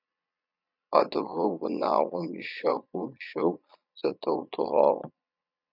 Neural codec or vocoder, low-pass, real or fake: vocoder, 22.05 kHz, 80 mel bands, Vocos; 5.4 kHz; fake